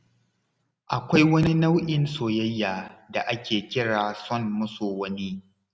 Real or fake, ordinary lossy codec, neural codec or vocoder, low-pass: real; none; none; none